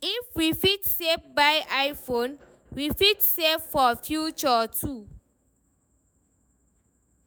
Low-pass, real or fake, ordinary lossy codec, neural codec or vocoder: none; fake; none; autoencoder, 48 kHz, 128 numbers a frame, DAC-VAE, trained on Japanese speech